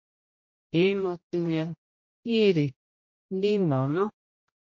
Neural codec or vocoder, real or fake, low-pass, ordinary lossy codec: codec, 16 kHz, 0.5 kbps, X-Codec, HuBERT features, trained on general audio; fake; 7.2 kHz; MP3, 48 kbps